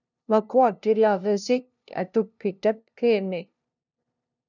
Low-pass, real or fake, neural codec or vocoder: 7.2 kHz; fake; codec, 16 kHz, 0.5 kbps, FunCodec, trained on LibriTTS, 25 frames a second